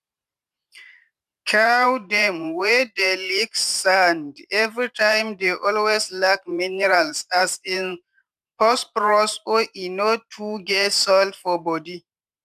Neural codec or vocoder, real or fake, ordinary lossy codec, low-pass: vocoder, 44.1 kHz, 128 mel bands every 512 samples, BigVGAN v2; fake; none; 14.4 kHz